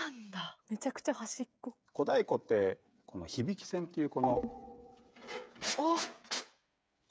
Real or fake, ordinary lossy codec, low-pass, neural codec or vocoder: fake; none; none; codec, 16 kHz, 8 kbps, FreqCodec, smaller model